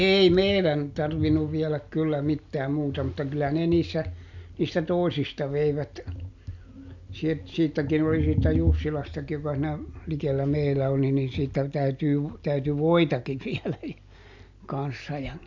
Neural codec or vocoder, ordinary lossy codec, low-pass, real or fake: none; MP3, 64 kbps; 7.2 kHz; real